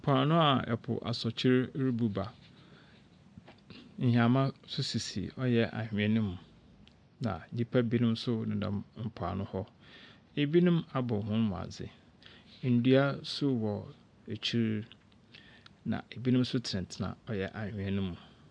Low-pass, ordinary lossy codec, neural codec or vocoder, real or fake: 9.9 kHz; MP3, 96 kbps; none; real